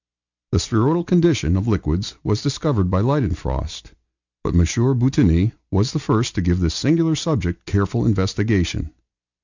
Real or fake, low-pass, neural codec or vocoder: real; 7.2 kHz; none